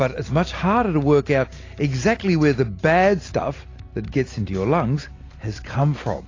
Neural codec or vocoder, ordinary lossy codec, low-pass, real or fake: none; AAC, 32 kbps; 7.2 kHz; real